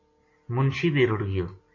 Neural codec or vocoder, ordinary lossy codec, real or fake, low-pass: none; MP3, 32 kbps; real; 7.2 kHz